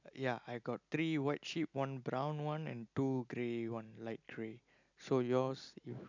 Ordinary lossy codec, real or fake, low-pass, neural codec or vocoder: none; real; 7.2 kHz; none